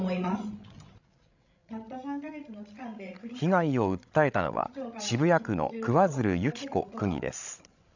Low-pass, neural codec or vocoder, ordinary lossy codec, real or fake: 7.2 kHz; codec, 16 kHz, 16 kbps, FreqCodec, larger model; none; fake